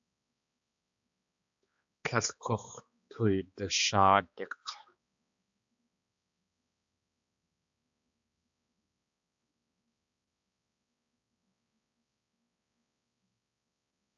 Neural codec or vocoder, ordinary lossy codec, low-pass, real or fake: codec, 16 kHz, 2 kbps, X-Codec, HuBERT features, trained on balanced general audio; AAC, 64 kbps; 7.2 kHz; fake